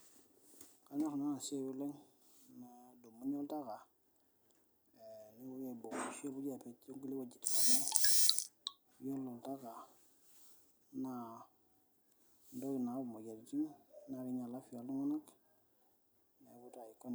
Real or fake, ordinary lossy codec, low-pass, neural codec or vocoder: real; none; none; none